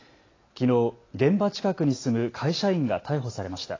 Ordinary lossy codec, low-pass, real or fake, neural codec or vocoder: AAC, 32 kbps; 7.2 kHz; real; none